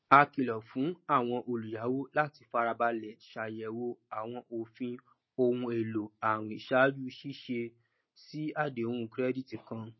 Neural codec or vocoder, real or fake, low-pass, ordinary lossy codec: none; real; 7.2 kHz; MP3, 24 kbps